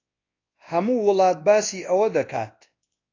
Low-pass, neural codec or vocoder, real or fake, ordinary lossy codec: 7.2 kHz; codec, 24 kHz, 0.9 kbps, WavTokenizer, large speech release; fake; AAC, 32 kbps